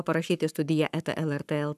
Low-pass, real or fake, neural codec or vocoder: 14.4 kHz; fake; codec, 44.1 kHz, 7.8 kbps, Pupu-Codec